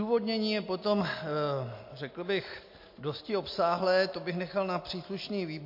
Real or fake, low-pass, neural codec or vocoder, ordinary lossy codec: real; 5.4 kHz; none; MP3, 32 kbps